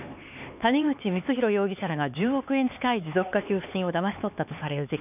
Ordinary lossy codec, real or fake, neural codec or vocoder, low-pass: none; fake; codec, 16 kHz, 4 kbps, X-Codec, HuBERT features, trained on LibriSpeech; 3.6 kHz